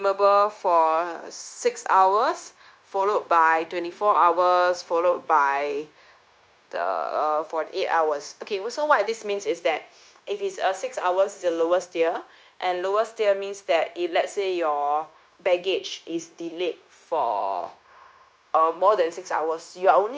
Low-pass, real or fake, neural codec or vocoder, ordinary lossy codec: none; fake; codec, 16 kHz, 0.9 kbps, LongCat-Audio-Codec; none